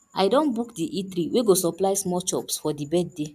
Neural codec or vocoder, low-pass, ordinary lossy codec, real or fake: none; 14.4 kHz; none; real